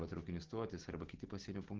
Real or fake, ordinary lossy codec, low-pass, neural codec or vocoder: real; Opus, 16 kbps; 7.2 kHz; none